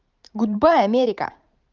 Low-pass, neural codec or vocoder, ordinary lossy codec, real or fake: 7.2 kHz; vocoder, 44.1 kHz, 128 mel bands every 512 samples, BigVGAN v2; Opus, 24 kbps; fake